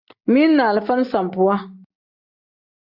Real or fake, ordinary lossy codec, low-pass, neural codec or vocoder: real; Opus, 64 kbps; 5.4 kHz; none